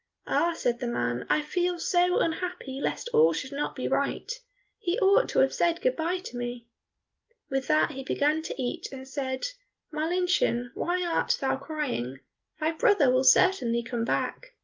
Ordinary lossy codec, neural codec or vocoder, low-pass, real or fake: Opus, 24 kbps; none; 7.2 kHz; real